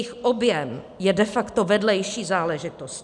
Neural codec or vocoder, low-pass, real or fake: none; 10.8 kHz; real